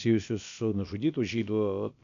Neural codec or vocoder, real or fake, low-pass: codec, 16 kHz, about 1 kbps, DyCAST, with the encoder's durations; fake; 7.2 kHz